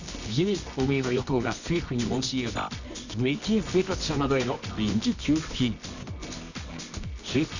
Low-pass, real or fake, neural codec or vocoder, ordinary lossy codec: 7.2 kHz; fake; codec, 24 kHz, 0.9 kbps, WavTokenizer, medium music audio release; none